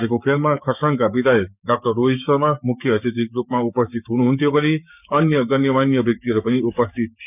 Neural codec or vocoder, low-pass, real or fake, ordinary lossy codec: codec, 16 kHz, 6 kbps, DAC; 3.6 kHz; fake; none